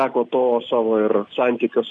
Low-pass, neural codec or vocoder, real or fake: 10.8 kHz; none; real